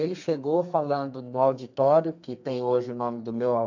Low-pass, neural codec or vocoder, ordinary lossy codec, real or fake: 7.2 kHz; codec, 32 kHz, 1.9 kbps, SNAC; AAC, 48 kbps; fake